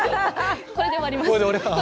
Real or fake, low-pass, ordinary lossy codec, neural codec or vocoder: real; none; none; none